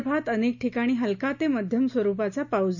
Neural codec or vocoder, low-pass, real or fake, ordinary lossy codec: none; 7.2 kHz; real; none